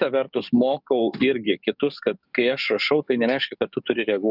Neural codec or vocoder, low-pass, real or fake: codec, 44.1 kHz, 7.8 kbps, DAC; 5.4 kHz; fake